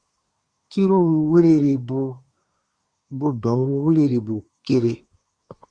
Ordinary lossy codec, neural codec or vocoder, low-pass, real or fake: Opus, 64 kbps; codec, 24 kHz, 1 kbps, SNAC; 9.9 kHz; fake